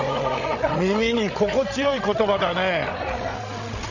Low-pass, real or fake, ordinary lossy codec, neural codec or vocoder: 7.2 kHz; fake; none; codec, 16 kHz, 16 kbps, FreqCodec, larger model